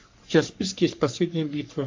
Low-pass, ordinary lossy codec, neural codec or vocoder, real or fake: 7.2 kHz; MP3, 64 kbps; codec, 44.1 kHz, 3.4 kbps, Pupu-Codec; fake